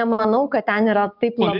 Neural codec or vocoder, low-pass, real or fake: none; 5.4 kHz; real